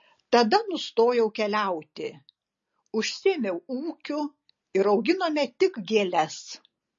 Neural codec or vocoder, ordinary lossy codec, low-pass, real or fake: none; MP3, 32 kbps; 7.2 kHz; real